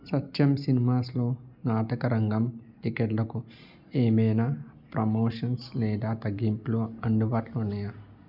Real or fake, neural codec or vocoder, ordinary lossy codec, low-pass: real; none; none; 5.4 kHz